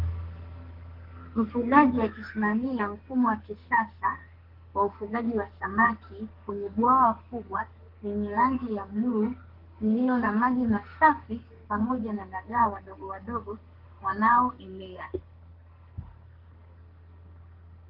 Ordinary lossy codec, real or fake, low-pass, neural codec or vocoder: Opus, 16 kbps; fake; 5.4 kHz; codec, 44.1 kHz, 2.6 kbps, SNAC